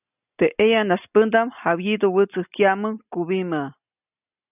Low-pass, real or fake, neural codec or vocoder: 3.6 kHz; real; none